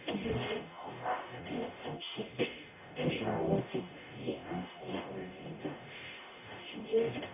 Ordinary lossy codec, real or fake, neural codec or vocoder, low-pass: none; fake; codec, 44.1 kHz, 0.9 kbps, DAC; 3.6 kHz